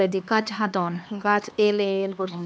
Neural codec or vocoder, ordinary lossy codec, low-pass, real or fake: codec, 16 kHz, 2 kbps, X-Codec, HuBERT features, trained on LibriSpeech; none; none; fake